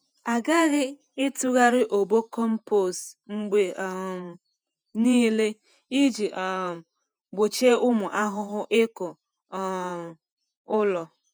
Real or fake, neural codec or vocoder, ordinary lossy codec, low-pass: fake; vocoder, 48 kHz, 128 mel bands, Vocos; none; none